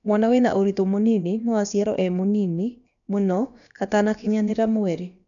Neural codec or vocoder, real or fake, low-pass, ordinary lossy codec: codec, 16 kHz, about 1 kbps, DyCAST, with the encoder's durations; fake; 7.2 kHz; none